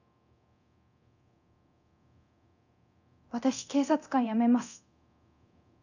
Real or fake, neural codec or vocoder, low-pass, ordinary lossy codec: fake; codec, 24 kHz, 0.9 kbps, DualCodec; 7.2 kHz; none